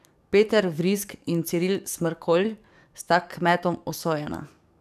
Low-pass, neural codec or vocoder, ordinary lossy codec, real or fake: 14.4 kHz; codec, 44.1 kHz, 7.8 kbps, DAC; none; fake